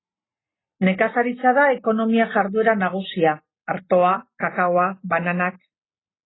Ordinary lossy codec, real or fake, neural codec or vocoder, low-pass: AAC, 16 kbps; real; none; 7.2 kHz